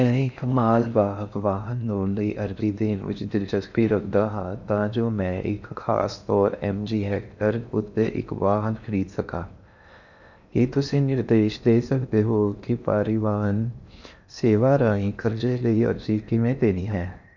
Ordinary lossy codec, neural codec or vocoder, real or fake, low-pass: none; codec, 16 kHz in and 24 kHz out, 0.6 kbps, FocalCodec, streaming, 4096 codes; fake; 7.2 kHz